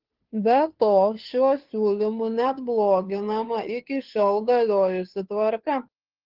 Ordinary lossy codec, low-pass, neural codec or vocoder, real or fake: Opus, 16 kbps; 5.4 kHz; codec, 16 kHz, 2 kbps, FunCodec, trained on Chinese and English, 25 frames a second; fake